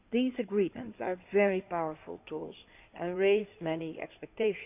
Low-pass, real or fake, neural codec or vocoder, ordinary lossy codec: 3.6 kHz; fake; codec, 16 kHz in and 24 kHz out, 2.2 kbps, FireRedTTS-2 codec; none